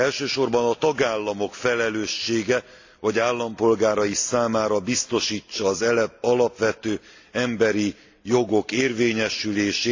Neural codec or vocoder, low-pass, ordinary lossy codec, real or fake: none; 7.2 kHz; AAC, 48 kbps; real